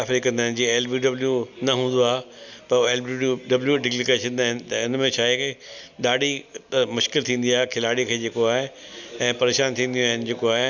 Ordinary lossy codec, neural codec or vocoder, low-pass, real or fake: none; none; 7.2 kHz; real